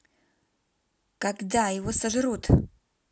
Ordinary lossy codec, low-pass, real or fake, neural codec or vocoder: none; none; real; none